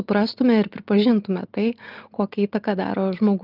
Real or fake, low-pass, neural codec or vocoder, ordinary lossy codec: real; 5.4 kHz; none; Opus, 24 kbps